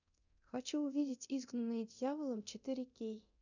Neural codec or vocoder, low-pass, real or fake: codec, 24 kHz, 0.9 kbps, DualCodec; 7.2 kHz; fake